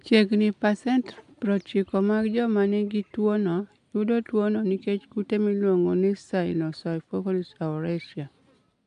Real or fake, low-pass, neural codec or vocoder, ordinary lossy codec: real; 10.8 kHz; none; AAC, 64 kbps